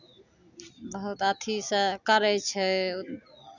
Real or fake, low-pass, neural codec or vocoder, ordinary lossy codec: real; 7.2 kHz; none; none